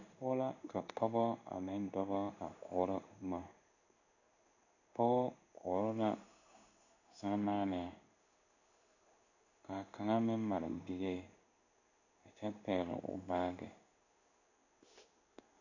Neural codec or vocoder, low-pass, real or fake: codec, 16 kHz in and 24 kHz out, 1 kbps, XY-Tokenizer; 7.2 kHz; fake